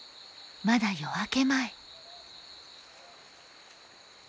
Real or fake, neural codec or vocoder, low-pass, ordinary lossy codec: real; none; none; none